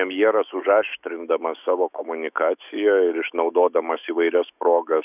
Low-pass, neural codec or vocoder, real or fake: 3.6 kHz; autoencoder, 48 kHz, 128 numbers a frame, DAC-VAE, trained on Japanese speech; fake